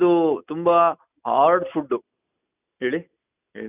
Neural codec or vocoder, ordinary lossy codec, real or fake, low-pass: none; none; real; 3.6 kHz